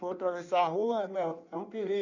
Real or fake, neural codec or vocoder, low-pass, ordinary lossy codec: fake; codec, 16 kHz in and 24 kHz out, 1.1 kbps, FireRedTTS-2 codec; 7.2 kHz; none